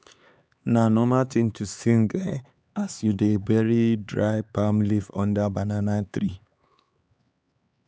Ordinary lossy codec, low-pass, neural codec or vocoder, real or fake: none; none; codec, 16 kHz, 4 kbps, X-Codec, HuBERT features, trained on LibriSpeech; fake